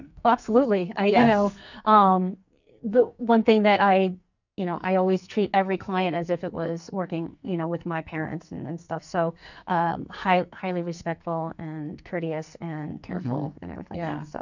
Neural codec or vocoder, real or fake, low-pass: codec, 44.1 kHz, 2.6 kbps, SNAC; fake; 7.2 kHz